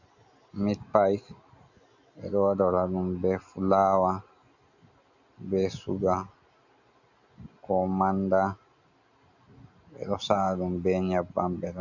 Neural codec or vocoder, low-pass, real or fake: none; 7.2 kHz; real